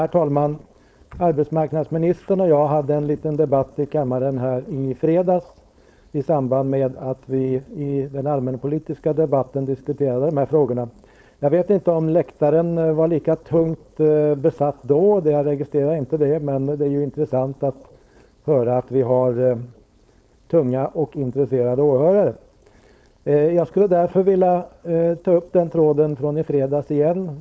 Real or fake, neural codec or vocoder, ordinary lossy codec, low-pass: fake; codec, 16 kHz, 4.8 kbps, FACodec; none; none